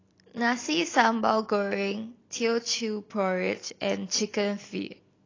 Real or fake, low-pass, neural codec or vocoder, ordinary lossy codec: real; 7.2 kHz; none; AAC, 32 kbps